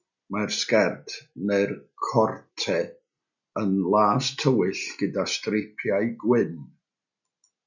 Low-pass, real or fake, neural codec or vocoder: 7.2 kHz; real; none